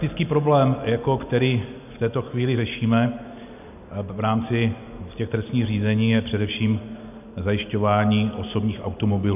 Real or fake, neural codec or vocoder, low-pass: real; none; 3.6 kHz